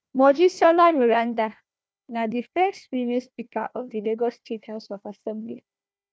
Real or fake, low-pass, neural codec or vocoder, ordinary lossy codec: fake; none; codec, 16 kHz, 1 kbps, FunCodec, trained on Chinese and English, 50 frames a second; none